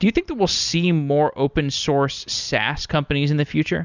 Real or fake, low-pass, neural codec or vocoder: real; 7.2 kHz; none